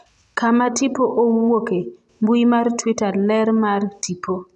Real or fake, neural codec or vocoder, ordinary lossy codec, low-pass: real; none; none; none